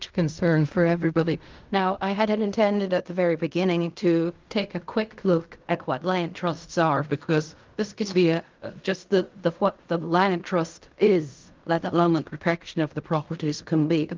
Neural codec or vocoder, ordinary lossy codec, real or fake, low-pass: codec, 16 kHz in and 24 kHz out, 0.4 kbps, LongCat-Audio-Codec, fine tuned four codebook decoder; Opus, 24 kbps; fake; 7.2 kHz